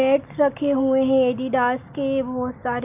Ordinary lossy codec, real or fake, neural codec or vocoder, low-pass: AAC, 32 kbps; real; none; 3.6 kHz